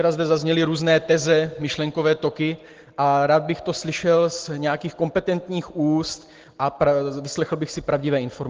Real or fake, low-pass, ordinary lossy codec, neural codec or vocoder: real; 7.2 kHz; Opus, 16 kbps; none